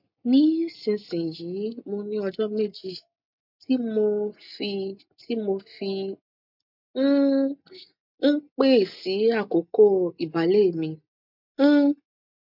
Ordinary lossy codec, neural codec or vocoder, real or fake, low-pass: MP3, 48 kbps; none; real; 5.4 kHz